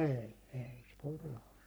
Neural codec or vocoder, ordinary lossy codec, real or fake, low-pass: codec, 44.1 kHz, 3.4 kbps, Pupu-Codec; none; fake; none